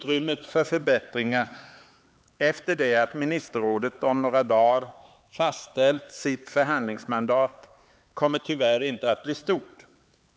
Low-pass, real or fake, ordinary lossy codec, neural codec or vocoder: none; fake; none; codec, 16 kHz, 4 kbps, X-Codec, HuBERT features, trained on LibriSpeech